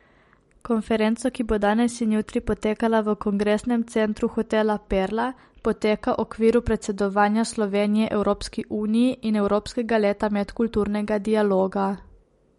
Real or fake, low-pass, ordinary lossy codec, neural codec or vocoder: real; 19.8 kHz; MP3, 48 kbps; none